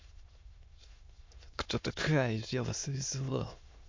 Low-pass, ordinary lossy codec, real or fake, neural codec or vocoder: 7.2 kHz; MP3, 48 kbps; fake; autoencoder, 22.05 kHz, a latent of 192 numbers a frame, VITS, trained on many speakers